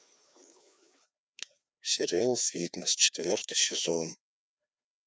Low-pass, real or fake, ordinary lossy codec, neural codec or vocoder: none; fake; none; codec, 16 kHz, 2 kbps, FreqCodec, larger model